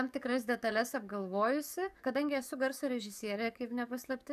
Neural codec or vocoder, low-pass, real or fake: codec, 44.1 kHz, 7.8 kbps, DAC; 14.4 kHz; fake